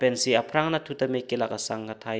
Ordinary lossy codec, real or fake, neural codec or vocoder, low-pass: none; real; none; none